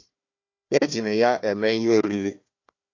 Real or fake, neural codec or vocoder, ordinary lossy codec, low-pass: fake; codec, 16 kHz, 1 kbps, FunCodec, trained on Chinese and English, 50 frames a second; AAC, 48 kbps; 7.2 kHz